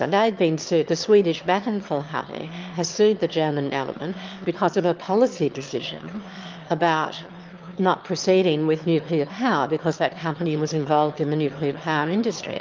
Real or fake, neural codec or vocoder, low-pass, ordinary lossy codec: fake; autoencoder, 22.05 kHz, a latent of 192 numbers a frame, VITS, trained on one speaker; 7.2 kHz; Opus, 32 kbps